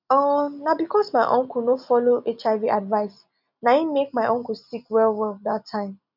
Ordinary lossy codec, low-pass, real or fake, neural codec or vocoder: none; 5.4 kHz; real; none